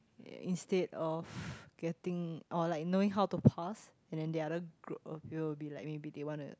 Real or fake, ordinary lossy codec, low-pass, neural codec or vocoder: real; none; none; none